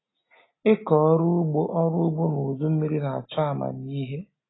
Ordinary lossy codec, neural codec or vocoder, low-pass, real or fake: AAC, 16 kbps; none; 7.2 kHz; real